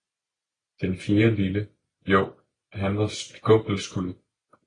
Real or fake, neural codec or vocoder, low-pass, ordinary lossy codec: real; none; 9.9 kHz; AAC, 32 kbps